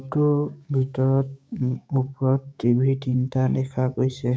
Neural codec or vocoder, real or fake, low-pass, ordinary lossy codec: codec, 16 kHz, 6 kbps, DAC; fake; none; none